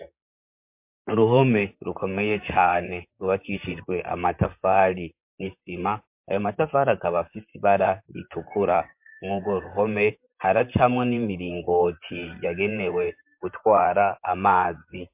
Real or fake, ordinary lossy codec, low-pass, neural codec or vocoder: fake; MP3, 32 kbps; 3.6 kHz; vocoder, 44.1 kHz, 128 mel bands, Pupu-Vocoder